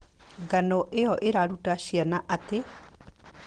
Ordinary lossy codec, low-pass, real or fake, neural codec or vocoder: Opus, 16 kbps; 9.9 kHz; real; none